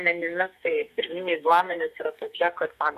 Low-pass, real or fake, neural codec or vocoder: 14.4 kHz; fake; codec, 32 kHz, 1.9 kbps, SNAC